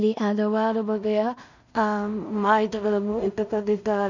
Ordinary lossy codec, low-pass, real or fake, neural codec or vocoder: none; 7.2 kHz; fake; codec, 16 kHz in and 24 kHz out, 0.4 kbps, LongCat-Audio-Codec, two codebook decoder